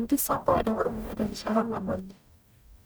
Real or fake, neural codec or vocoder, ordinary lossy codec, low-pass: fake; codec, 44.1 kHz, 0.9 kbps, DAC; none; none